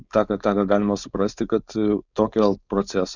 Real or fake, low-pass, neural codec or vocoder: fake; 7.2 kHz; codec, 16 kHz, 4.8 kbps, FACodec